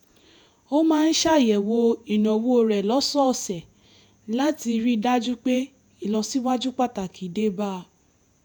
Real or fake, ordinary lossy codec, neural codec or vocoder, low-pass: fake; none; vocoder, 48 kHz, 128 mel bands, Vocos; none